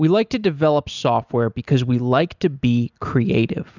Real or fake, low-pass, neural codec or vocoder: real; 7.2 kHz; none